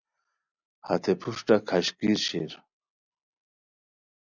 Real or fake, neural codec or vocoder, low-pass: real; none; 7.2 kHz